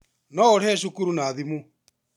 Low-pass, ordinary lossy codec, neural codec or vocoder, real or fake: 19.8 kHz; none; none; real